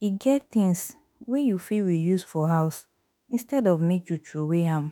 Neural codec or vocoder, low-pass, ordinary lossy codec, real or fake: autoencoder, 48 kHz, 32 numbers a frame, DAC-VAE, trained on Japanese speech; none; none; fake